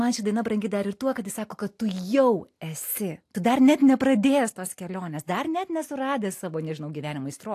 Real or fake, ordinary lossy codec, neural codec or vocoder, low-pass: real; AAC, 64 kbps; none; 14.4 kHz